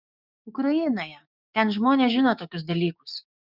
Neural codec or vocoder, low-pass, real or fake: codec, 16 kHz, 6 kbps, DAC; 5.4 kHz; fake